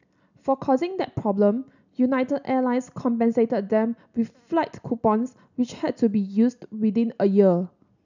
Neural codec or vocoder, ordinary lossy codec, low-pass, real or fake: none; none; 7.2 kHz; real